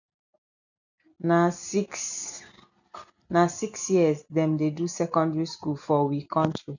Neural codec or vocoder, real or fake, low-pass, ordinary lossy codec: none; real; 7.2 kHz; none